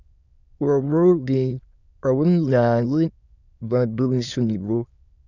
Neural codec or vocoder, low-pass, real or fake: autoencoder, 22.05 kHz, a latent of 192 numbers a frame, VITS, trained on many speakers; 7.2 kHz; fake